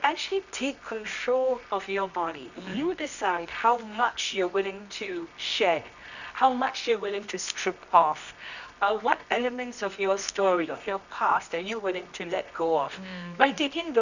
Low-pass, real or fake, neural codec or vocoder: 7.2 kHz; fake; codec, 24 kHz, 0.9 kbps, WavTokenizer, medium music audio release